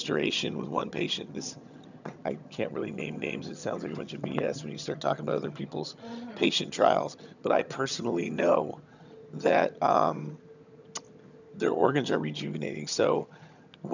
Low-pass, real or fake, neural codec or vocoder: 7.2 kHz; fake; vocoder, 22.05 kHz, 80 mel bands, HiFi-GAN